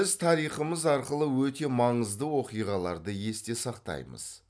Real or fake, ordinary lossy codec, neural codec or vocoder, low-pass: real; none; none; none